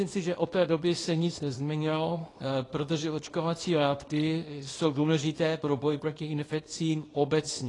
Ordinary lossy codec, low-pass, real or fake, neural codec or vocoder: AAC, 32 kbps; 10.8 kHz; fake; codec, 24 kHz, 0.9 kbps, WavTokenizer, small release